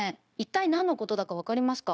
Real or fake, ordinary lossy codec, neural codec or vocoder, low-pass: fake; none; codec, 16 kHz, 0.9 kbps, LongCat-Audio-Codec; none